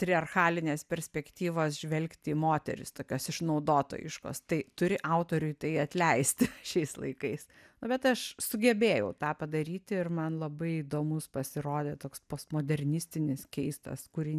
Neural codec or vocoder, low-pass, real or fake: none; 14.4 kHz; real